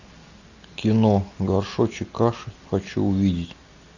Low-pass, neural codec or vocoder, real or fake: 7.2 kHz; none; real